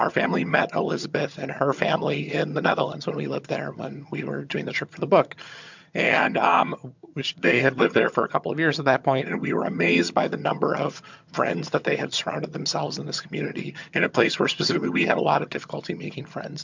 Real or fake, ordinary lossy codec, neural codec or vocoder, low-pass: fake; MP3, 64 kbps; vocoder, 22.05 kHz, 80 mel bands, HiFi-GAN; 7.2 kHz